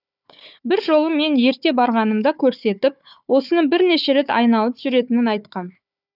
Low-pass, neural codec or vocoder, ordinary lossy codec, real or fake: 5.4 kHz; codec, 16 kHz, 4 kbps, FunCodec, trained on Chinese and English, 50 frames a second; none; fake